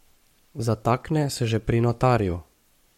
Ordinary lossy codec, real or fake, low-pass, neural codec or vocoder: MP3, 64 kbps; fake; 19.8 kHz; codec, 44.1 kHz, 7.8 kbps, Pupu-Codec